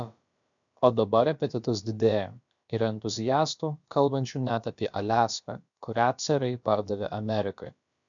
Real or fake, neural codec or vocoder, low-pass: fake; codec, 16 kHz, about 1 kbps, DyCAST, with the encoder's durations; 7.2 kHz